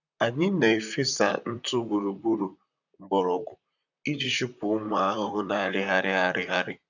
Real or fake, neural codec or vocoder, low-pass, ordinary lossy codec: fake; vocoder, 44.1 kHz, 128 mel bands, Pupu-Vocoder; 7.2 kHz; none